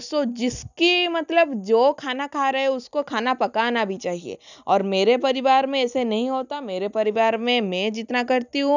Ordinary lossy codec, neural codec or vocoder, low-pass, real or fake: none; none; 7.2 kHz; real